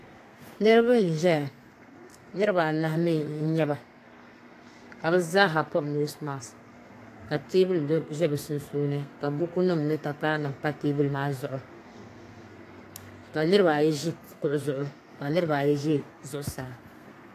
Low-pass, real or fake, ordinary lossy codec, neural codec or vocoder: 14.4 kHz; fake; AAC, 64 kbps; codec, 32 kHz, 1.9 kbps, SNAC